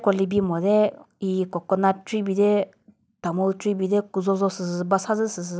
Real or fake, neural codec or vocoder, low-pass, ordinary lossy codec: real; none; none; none